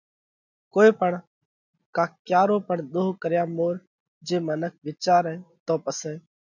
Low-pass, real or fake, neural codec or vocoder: 7.2 kHz; real; none